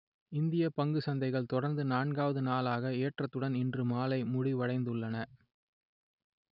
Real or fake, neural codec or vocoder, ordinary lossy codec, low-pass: real; none; none; 5.4 kHz